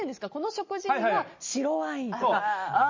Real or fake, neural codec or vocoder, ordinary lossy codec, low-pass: real; none; MP3, 32 kbps; 7.2 kHz